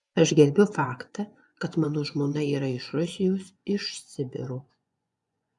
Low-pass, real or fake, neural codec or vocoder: 10.8 kHz; fake; vocoder, 24 kHz, 100 mel bands, Vocos